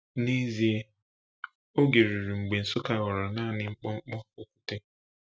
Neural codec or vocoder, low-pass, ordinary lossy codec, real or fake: none; none; none; real